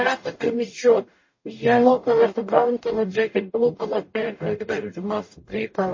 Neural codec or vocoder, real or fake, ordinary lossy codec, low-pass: codec, 44.1 kHz, 0.9 kbps, DAC; fake; MP3, 32 kbps; 7.2 kHz